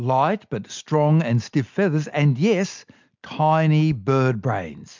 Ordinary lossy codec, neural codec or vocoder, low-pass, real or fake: MP3, 64 kbps; none; 7.2 kHz; real